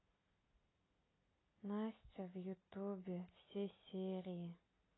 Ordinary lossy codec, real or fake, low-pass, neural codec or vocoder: AAC, 16 kbps; real; 7.2 kHz; none